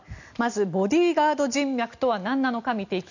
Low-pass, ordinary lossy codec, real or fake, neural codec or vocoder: 7.2 kHz; none; real; none